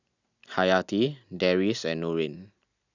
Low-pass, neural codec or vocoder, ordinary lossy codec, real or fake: 7.2 kHz; none; none; real